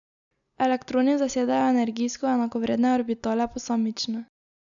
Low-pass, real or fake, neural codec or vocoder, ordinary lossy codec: 7.2 kHz; real; none; MP3, 96 kbps